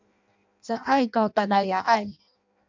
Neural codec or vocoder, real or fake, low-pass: codec, 16 kHz in and 24 kHz out, 0.6 kbps, FireRedTTS-2 codec; fake; 7.2 kHz